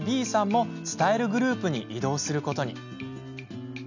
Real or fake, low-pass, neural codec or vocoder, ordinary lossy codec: real; 7.2 kHz; none; none